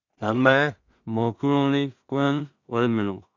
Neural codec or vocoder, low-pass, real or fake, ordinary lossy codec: codec, 16 kHz in and 24 kHz out, 0.4 kbps, LongCat-Audio-Codec, two codebook decoder; 7.2 kHz; fake; Opus, 64 kbps